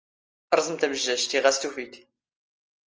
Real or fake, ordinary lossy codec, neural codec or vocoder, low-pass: real; Opus, 24 kbps; none; 7.2 kHz